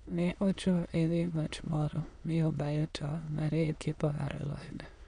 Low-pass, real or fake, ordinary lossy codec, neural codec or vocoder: 9.9 kHz; fake; none; autoencoder, 22.05 kHz, a latent of 192 numbers a frame, VITS, trained on many speakers